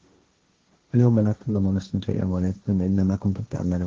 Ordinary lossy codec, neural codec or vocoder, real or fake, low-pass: Opus, 16 kbps; codec, 16 kHz, 1.1 kbps, Voila-Tokenizer; fake; 7.2 kHz